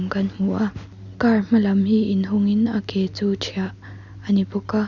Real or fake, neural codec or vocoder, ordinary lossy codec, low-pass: real; none; none; 7.2 kHz